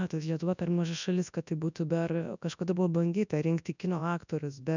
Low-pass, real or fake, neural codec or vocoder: 7.2 kHz; fake; codec, 24 kHz, 0.9 kbps, WavTokenizer, large speech release